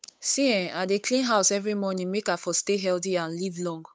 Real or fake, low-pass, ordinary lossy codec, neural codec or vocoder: fake; none; none; codec, 16 kHz, 8 kbps, FunCodec, trained on Chinese and English, 25 frames a second